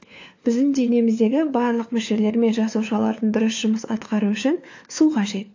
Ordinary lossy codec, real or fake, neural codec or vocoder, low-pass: AAC, 48 kbps; fake; codec, 16 kHz, 4 kbps, FunCodec, trained on LibriTTS, 50 frames a second; 7.2 kHz